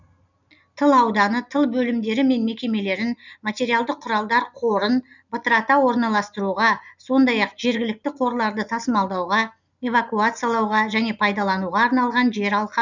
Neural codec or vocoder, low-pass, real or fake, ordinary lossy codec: none; 7.2 kHz; real; none